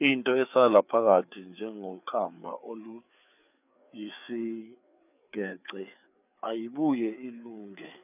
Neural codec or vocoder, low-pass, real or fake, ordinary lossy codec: codec, 16 kHz, 4 kbps, FreqCodec, larger model; 3.6 kHz; fake; none